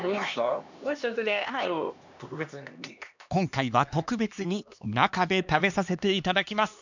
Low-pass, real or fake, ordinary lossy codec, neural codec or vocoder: 7.2 kHz; fake; none; codec, 16 kHz, 2 kbps, X-Codec, HuBERT features, trained on LibriSpeech